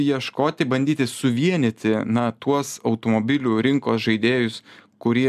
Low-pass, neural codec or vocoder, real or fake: 14.4 kHz; none; real